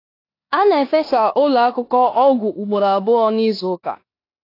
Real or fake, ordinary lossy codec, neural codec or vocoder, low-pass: fake; AAC, 32 kbps; codec, 16 kHz in and 24 kHz out, 0.9 kbps, LongCat-Audio-Codec, four codebook decoder; 5.4 kHz